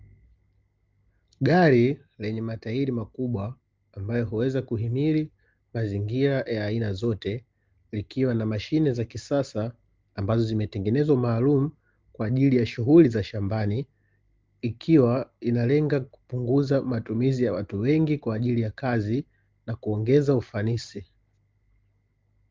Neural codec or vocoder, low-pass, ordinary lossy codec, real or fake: none; 7.2 kHz; Opus, 32 kbps; real